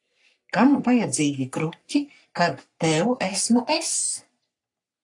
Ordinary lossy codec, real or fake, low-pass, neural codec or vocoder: AAC, 48 kbps; fake; 10.8 kHz; codec, 44.1 kHz, 3.4 kbps, Pupu-Codec